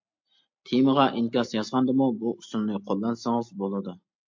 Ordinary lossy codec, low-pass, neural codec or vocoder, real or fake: MP3, 48 kbps; 7.2 kHz; none; real